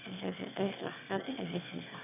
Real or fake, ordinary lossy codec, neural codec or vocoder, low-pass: fake; none; autoencoder, 22.05 kHz, a latent of 192 numbers a frame, VITS, trained on one speaker; 3.6 kHz